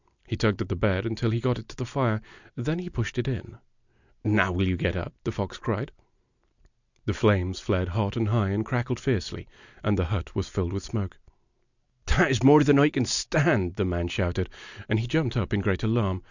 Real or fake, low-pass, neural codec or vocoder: real; 7.2 kHz; none